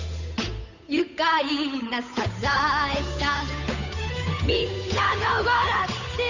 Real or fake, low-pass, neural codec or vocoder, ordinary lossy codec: fake; 7.2 kHz; codec, 16 kHz, 8 kbps, FunCodec, trained on Chinese and English, 25 frames a second; Opus, 64 kbps